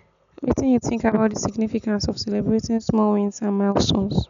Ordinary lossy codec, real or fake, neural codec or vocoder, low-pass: none; real; none; 7.2 kHz